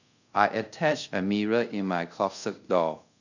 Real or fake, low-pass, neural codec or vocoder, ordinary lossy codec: fake; 7.2 kHz; codec, 24 kHz, 0.5 kbps, DualCodec; none